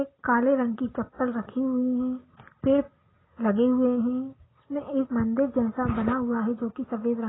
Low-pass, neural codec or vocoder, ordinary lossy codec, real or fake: 7.2 kHz; none; AAC, 16 kbps; real